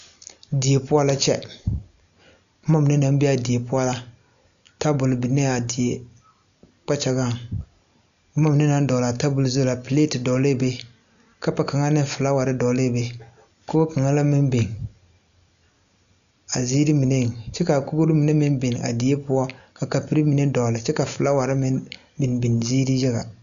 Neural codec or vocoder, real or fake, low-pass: none; real; 7.2 kHz